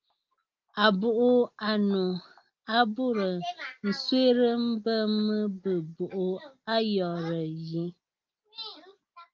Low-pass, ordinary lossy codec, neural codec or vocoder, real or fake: 7.2 kHz; Opus, 32 kbps; none; real